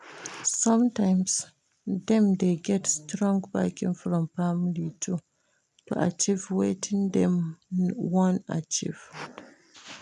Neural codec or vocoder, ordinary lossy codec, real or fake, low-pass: none; none; real; none